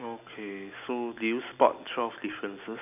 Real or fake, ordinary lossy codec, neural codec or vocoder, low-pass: real; none; none; 3.6 kHz